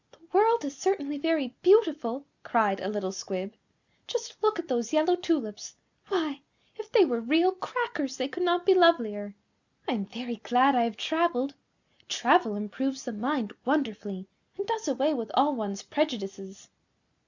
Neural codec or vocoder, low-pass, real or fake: none; 7.2 kHz; real